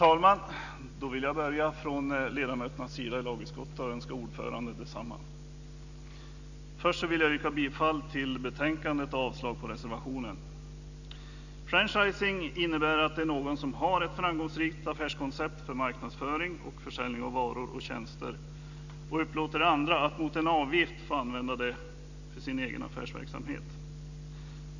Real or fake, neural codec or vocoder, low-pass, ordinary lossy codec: real; none; 7.2 kHz; none